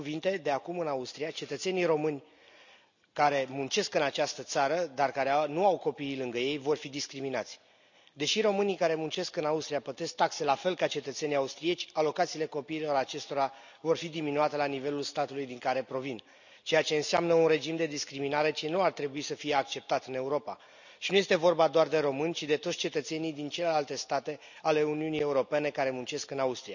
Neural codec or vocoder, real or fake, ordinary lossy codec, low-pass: none; real; none; 7.2 kHz